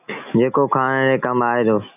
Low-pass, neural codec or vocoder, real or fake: 3.6 kHz; none; real